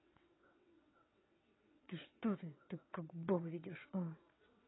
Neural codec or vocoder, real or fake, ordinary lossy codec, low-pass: vocoder, 22.05 kHz, 80 mel bands, WaveNeXt; fake; AAC, 16 kbps; 7.2 kHz